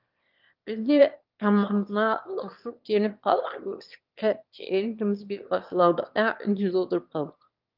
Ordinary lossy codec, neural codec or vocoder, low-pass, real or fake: Opus, 32 kbps; autoencoder, 22.05 kHz, a latent of 192 numbers a frame, VITS, trained on one speaker; 5.4 kHz; fake